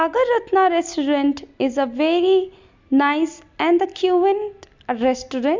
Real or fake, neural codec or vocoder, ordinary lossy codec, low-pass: real; none; MP3, 64 kbps; 7.2 kHz